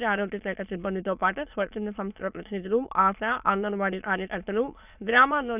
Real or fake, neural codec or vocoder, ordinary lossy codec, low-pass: fake; autoencoder, 22.05 kHz, a latent of 192 numbers a frame, VITS, trained on many speakers; none; 3.6 kHz